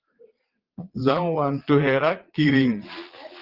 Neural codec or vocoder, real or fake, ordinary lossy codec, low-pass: vocoder, 22.05 kHz, 80 mel bands, Vocos; fake; Opus, 16 kbps; 5.4 kHz